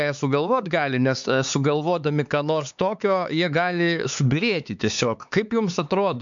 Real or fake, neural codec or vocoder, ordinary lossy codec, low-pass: fake; codec, 16 kHz, 4 kbps, X-Codec, HuBERT features, trained on LibriSpeech; MP3, 64 kbps; 7.2 kHz